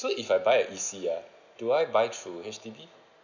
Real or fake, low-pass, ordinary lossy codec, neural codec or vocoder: real; 7.2 kHz; none; none